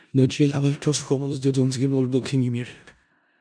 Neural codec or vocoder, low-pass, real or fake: codec, 16 kHz in and 24 kHz out, 0.4 kbps, LongCat-Audio-Codec, four codebook decoder; 9.9 kHz; fake